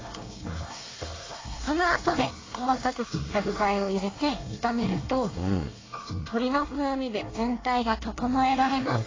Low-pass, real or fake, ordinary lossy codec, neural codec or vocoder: 7.2 kHz; fake; AAC, 32 kbps; codec, 24 kHz, 1 kbps, SNAC